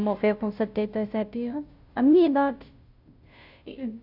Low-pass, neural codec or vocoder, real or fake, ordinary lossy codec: 5.4 kHz; codec, 16 kHz, 0.5 kbps, FunCodec, trained on Chinese and English, 25 frames a second; fake; AAC, 48 kbps